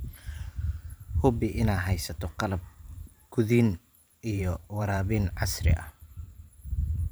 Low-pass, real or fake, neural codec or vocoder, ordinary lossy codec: none; fake; vocoder, 44.1 kHz, 128 mel bands every 512 samples, BigVGAN v2; none